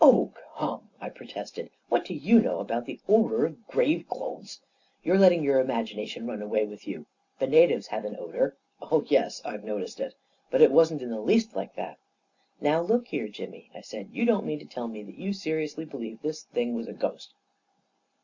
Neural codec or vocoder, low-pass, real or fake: none; 7.2 kHz; real